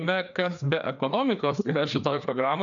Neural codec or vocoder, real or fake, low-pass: codec, 16 kHz, 2 kbps, FreqCodec, larger model; fake; 7.2 kHz